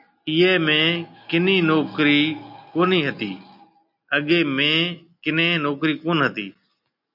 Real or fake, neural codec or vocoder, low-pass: real; none; 5.4 kHz